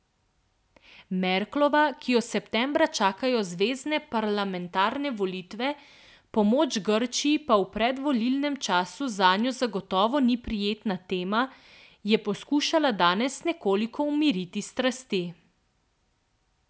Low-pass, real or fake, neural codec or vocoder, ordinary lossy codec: none; real; none; none